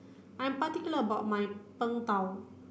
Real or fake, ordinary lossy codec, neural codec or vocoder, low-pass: real; none; none; none